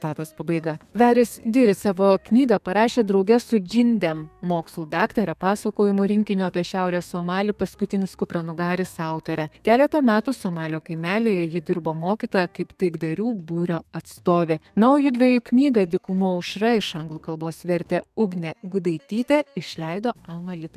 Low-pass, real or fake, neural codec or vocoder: 14.4 kHz; fake; codec, 32 kHz, 1.9 kbps, SNAC